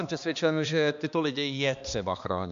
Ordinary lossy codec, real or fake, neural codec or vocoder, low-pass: MP3, 64 kbps; fake; codec, 16 kHz, 2 kbps, X-Codec, HuBERT features, trained on balanced general audio; 7.2 kHz